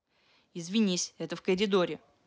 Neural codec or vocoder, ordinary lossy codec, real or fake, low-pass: none; none; real; none